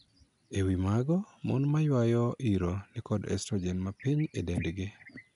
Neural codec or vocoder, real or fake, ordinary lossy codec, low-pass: none; real; none; 10.8 kHz